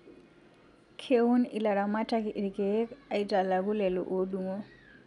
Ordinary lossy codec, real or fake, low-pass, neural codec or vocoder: none; real; 10.8 kHz; none